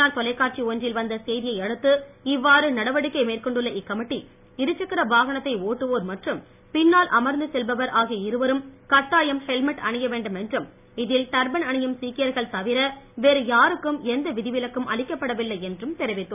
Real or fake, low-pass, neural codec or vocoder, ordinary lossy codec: real; 3.6 kHz; none; none